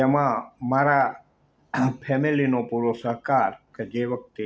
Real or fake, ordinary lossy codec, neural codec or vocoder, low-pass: real; none; none; none